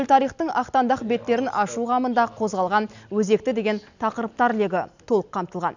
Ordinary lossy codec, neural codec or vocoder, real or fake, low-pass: none; none; real; 7.2 kHz